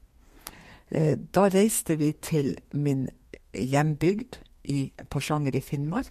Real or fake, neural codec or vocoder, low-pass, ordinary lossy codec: fake; codec, 32 kHz, 1.9 kbps, SNAC; 14.4 kHz; MP3, 64 kbps